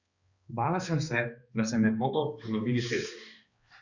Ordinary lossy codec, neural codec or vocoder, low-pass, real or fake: Opus, 64 kbps; codec, 16 kHz, 2 kbps, X-Codec, HuBERT features, trained on balanced general audio; 7.2 kHz; fake